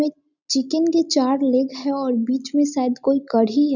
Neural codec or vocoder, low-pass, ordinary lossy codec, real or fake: none; 7.2 kHz; none; real